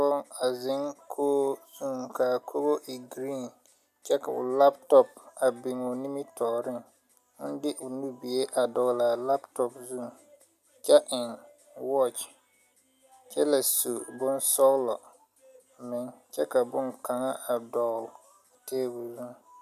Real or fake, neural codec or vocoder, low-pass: real; none; 14.4 kHz